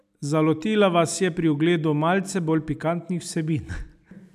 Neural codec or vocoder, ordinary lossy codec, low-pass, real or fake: none; none; 14.4 kHz; real